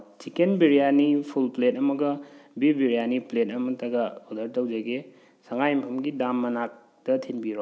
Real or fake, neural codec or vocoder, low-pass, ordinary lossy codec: real; none; none; none